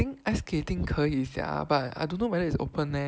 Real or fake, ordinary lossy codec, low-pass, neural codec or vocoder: real; none; none; none